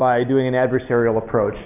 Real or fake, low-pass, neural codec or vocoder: real; 3.6 kHz; none